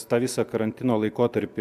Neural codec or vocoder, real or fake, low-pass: none; real; 14.4 kHz